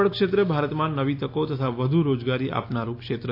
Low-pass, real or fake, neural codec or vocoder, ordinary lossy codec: 5.4 kHz; real; none; AAC, 32 kbps